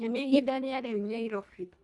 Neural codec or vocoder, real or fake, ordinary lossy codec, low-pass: codec, 24 kHz, 1.5 kbps, HILCodec; fake; none; none